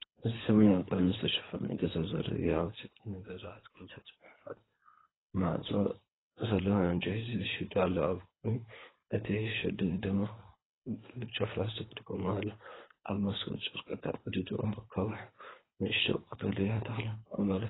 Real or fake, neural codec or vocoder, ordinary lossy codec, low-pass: fake; codec, 24 kHz, 3 kbps, HILCodec; AAC, 16 kbps; 7.2 kHz